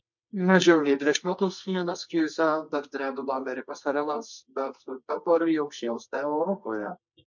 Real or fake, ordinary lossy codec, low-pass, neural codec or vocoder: fake; MP3, 48 kbps; 7.2 kHz; codec, 24 kHz, 0.9 kbps, WavTokenizer, medium music audio release